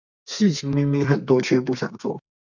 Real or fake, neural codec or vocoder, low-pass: fake; codec, 32 kHz, 1.9 kbps, SNAC; 7.2 kHz